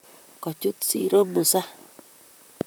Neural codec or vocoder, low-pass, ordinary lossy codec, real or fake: vocoder, 44.1 kHz, 128 mel bands, Pupu-Vocoder; none; none; fake